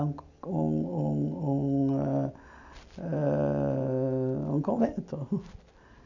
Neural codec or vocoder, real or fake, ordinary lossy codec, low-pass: none; real; none; 7.2 kHz